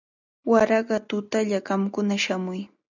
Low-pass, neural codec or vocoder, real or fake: 7.2 kHz; none; real